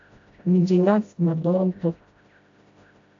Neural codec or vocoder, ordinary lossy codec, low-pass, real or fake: codec, 16 kHz, 0.5 kbps, FreqCodec, smaller model; AAC, 48 kbps; 7.2 kHz; fake